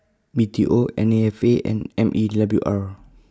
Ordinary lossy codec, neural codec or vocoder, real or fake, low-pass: none; none; real; none